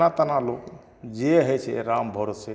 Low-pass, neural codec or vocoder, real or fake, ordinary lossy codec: none; none; real; none